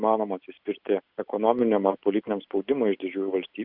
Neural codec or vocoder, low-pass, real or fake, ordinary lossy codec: none; 5.4 kHz; real; Opus, 64 kbps